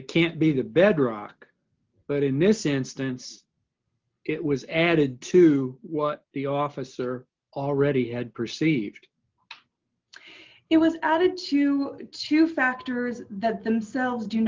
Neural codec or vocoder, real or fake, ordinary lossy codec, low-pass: none; real; Opus, 24 kbps; 7.2 kHz